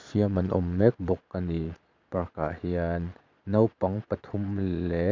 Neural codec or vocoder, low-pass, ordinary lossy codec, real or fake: vocoder, 22.05 kHz, 80 mel bands, Vocos; 7.2 kHz; MP3, 64 kbps; fake